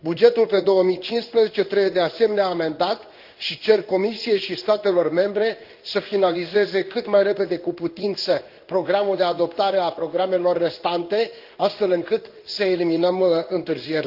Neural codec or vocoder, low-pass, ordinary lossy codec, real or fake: codec, 16 kHz in and 24 kHz out, 1 kbps, XY-Tokenizer; 5.4 kHz; Opus, 32 kbps; fake